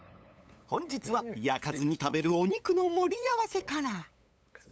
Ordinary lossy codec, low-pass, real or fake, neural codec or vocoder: none; none; fake; codec, 16 kHz, 8 kbps, FunCodec, trained on LibriTTS, 25 frames a second